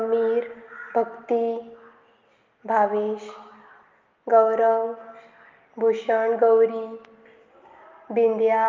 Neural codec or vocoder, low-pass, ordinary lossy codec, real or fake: none; 7.2 kHz; Opus, 32 kbps; real